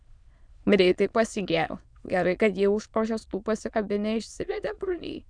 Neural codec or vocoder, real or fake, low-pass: autoencoder, 22.05 kHz, a latent of 192 numbers a frame, VITS, trained on many speakers; fake; 9.9 kHz